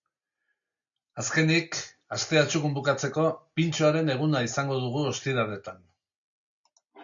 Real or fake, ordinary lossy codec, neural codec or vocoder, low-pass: real; MP3, 64 kbps; none; 7.2 kHz